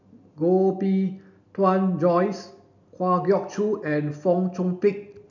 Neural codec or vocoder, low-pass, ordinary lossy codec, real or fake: none; 7.2 kHz; none; real